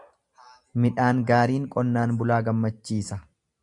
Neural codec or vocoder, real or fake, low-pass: none; real; 10.8 kHz